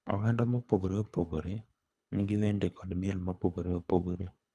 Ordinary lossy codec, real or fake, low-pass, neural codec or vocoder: none; fake; none; codec, 24 kHz, 3 kbps, HILCodec